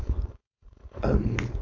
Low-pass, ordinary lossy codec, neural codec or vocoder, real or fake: 7.2 kHz; AAC, 48 kbps; codec, 16 kHz, 4.8 kbps, FACodec; fake